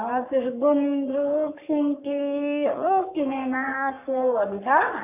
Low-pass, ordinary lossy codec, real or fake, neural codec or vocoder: 3.6 kHz; none; fake; codec, 44.1 kHz, 3.4 kbps, Pupu-Codec